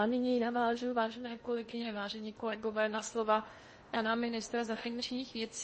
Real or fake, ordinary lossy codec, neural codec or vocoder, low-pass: fake; MP3, 32 kbps; codec, 16 kHz in and 24 kHz out, 0.8 kbps, FocalCodec, streaming, 65536 codes; 10.8 kHz